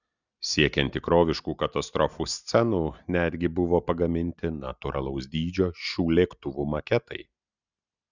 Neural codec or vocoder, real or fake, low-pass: none; real; 7.2 kHz